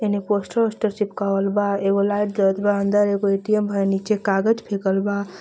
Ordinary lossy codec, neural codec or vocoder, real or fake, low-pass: none; none; real; none